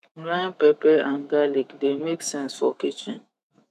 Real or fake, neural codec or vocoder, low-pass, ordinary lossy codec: fake; autoencoder, 48 kHz, 128 numbers a frame, DAC-VAE, trained on Japanese speech; 14.4 kHz; none